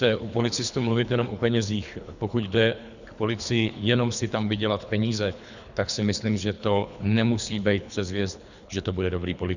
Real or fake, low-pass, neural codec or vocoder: fake; 7.2 kHz; codec, 24 kHz, 3 kbps, HILCodec